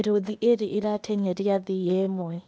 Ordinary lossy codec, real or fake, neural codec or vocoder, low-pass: none; fake; codec, 16 kHz, 0.8 kbps, ZipCodec; none